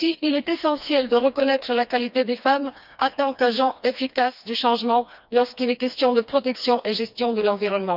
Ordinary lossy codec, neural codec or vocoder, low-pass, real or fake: none; codec, 16 kHz, 2 kbps, FreqCodec, smaller model; 5.4 kHz; fake